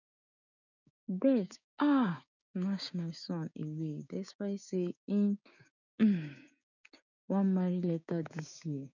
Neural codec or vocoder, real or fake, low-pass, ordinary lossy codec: codec, 16 kHz, 6 kbps, DAC; fake; 7.2 kHz; none